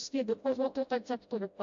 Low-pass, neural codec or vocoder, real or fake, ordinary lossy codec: 7.2 kHz; codec, 16 kHz, 0.5 kbps, FreqCodec, smaller model; fake; AAC, 64 kbps